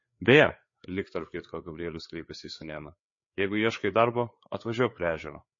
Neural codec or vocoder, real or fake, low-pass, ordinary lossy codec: codec, 16 kHz, 4 kbps, FreqCodec, larger model; fake; 7.2 kHz; MP3, 32 kbps